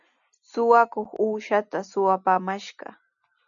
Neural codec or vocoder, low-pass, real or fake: none; 7.2 kHz; real